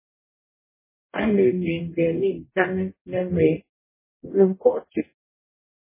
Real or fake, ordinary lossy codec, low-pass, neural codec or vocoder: fake; MP3, 16 kbps; 3.6 kHz; codec, 44.1 kHz, 0.9 kbps, DAC